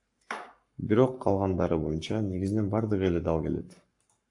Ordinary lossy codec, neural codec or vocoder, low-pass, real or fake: AAC, 64 kbps; codec, 44.1 kHz, 7.8 kbps, Pupu-Codec; 10.8 kHz; fake